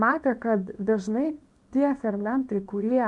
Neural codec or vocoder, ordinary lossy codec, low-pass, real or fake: codec, 24 kHz, 0.9 kbps, WavTokenizer, small release; MP3, 64 kbps; 10.8 kHz; fake